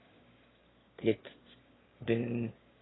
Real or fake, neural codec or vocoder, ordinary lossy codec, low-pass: fake; autoencoder, 22.05 kHz, a latent of 192 numbers a frame, VITS, trained on one speaker; AAC, 16 kbps; 7.2 kHz